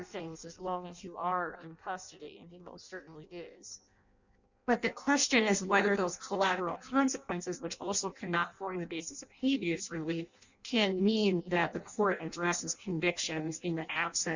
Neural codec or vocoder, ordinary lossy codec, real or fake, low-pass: codec, 16 kHz in and 24 kHz out, 0.6 kbps, FireRedTTS-2 codec; Opus, 64 kbps; fake; 7.2 kHz